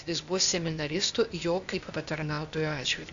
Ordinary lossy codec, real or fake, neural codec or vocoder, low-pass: AAC, 64 kbps; fake; codec, 16 kHz, 0.8 kbps, ZipCodec; 7.2 kHz